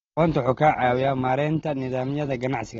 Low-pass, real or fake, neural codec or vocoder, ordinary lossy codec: 7.2 kHz; real; none; AAC, 24 kbps